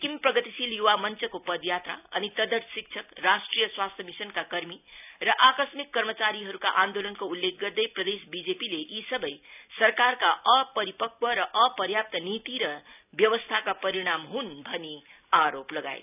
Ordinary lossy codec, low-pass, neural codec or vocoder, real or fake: none; 3.6 kHz; none; real